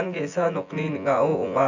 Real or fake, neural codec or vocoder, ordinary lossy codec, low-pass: fake; vocoder, 24 kHz, 100 mel bands, Vocos; none; 7.2 kHz